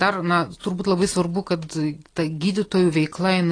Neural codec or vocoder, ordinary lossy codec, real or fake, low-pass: none; AAC, 32 kbps; real; 9.9 kHz